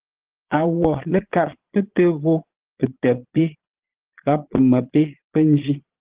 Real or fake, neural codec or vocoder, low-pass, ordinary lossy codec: fake; vocoder, 22.05 kHz, 80 mel bands, Vocos; 3.6 kHz; Opus, 16 kbps